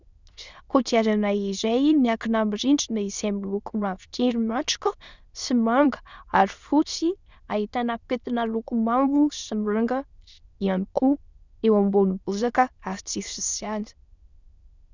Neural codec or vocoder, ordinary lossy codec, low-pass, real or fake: autoencoder, 22.05 kHz, a latent of 192 numbers a frame, VITS, trained on many speakers; Opus, 64 kbps; 7.2 kHz; fake